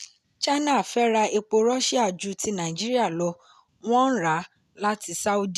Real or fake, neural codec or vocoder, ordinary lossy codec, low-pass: real; none; none; 14.4 kHz